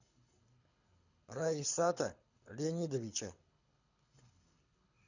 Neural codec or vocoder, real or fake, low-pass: codec, 24 kHz, 6 kbps, HILCodec; fake; 7.2 kHz